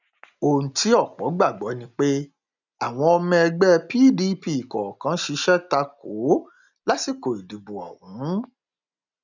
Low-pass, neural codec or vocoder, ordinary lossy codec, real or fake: 7.2 kHz; none; none; real